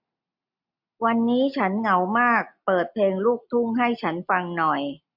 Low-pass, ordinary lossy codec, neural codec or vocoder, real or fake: 5.4 kHz; MP3, 48 kbps; none; real